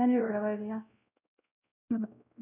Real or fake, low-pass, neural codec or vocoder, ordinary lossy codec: fake; 3.6 kHz; codec, 16 kHz, 0.5 kbps, X-Codec, WavLM features, trained on Multilingual LibriSpeech; none